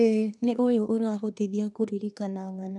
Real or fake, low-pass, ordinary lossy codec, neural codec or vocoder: fake; 10.8 kHz; none; codec, 24 kHz, 1 kbps, SNAC